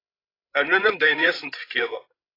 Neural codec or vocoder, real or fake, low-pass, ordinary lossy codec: codec, 16 kHz, 16 kbps, FreqCodec, larger model; fake; 5.4 kHz; AAC, 24 kbps